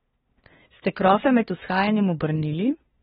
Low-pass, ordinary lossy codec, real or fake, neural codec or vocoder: 7.2 kHz; AAC, 16 kbps; fake; codec, 16 kHz, 2 kbps, FunCodec, trained on LibriTTS, 25 frames a second